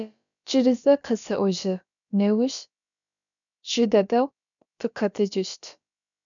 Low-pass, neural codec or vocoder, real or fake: 7.2 kHz; codec, 16 kHz, about 1 kbps, DyCAST, with the encoder's durations; fake